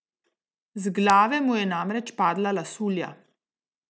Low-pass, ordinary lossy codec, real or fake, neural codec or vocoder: none; none; real; none